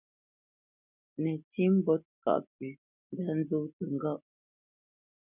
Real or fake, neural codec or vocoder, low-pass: real; none; 3.6 kHz